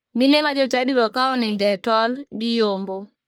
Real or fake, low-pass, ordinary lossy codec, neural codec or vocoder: fake; none; none; codec, 44.1 kHz, 1.7 kbps, Pupu-Codec